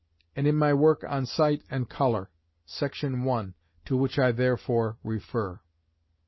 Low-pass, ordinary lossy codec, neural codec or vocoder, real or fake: 7.2 kHz; MP3, 24 kbps; none; real